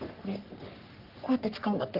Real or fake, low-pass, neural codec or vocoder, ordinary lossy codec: fake; 5.4 kHz; codec, 44.1 kHz, 3.4 kbps, Pupu-Codec; Opus, 24 kbps